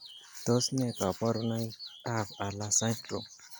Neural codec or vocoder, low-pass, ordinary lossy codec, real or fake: none; none; none; real